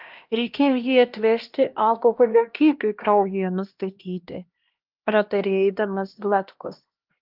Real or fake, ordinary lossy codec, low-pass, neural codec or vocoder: fake; Opus, 32 kbps; 5.4 kHz; codec, 16 kHz, 1 kbps, X-Codec, HuBERT features, trained on LibriSpeech